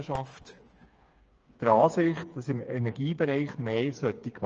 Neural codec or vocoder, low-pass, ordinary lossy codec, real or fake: codec, 16 kHz, 4 kbps, FreqCodec, smaller model; 7.2 kHz; Opus, 24 kbps; fake